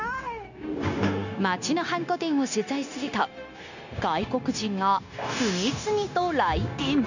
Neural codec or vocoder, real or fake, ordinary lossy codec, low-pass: codec, 16 kHz, 0.9 kbps, LongCat-Audio-Codec; fake; none; 7.2 kHz